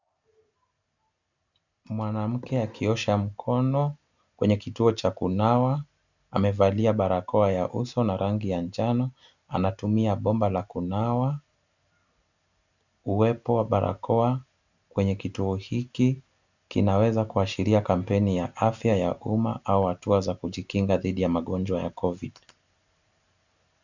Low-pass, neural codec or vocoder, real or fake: 7.2 kHz; none; real